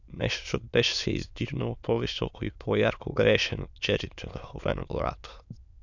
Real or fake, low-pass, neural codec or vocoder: fake; 7.2 kHz; autoencoder, 22.05 kHz, a latent of 192 numbers a frame, VITS, trained on many speakers